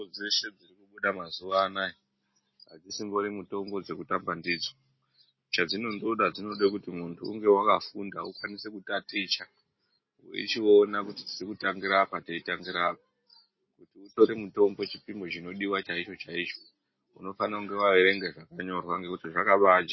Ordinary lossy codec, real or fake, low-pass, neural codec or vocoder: MP3, 24 kbps; real; 7.2 kHz; none